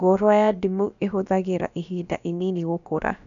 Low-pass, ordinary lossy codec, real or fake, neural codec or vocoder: 7.2 kHz; none; fake; codec, 16 kHz, about 1 kbps, DyCAST, with the encoder's durations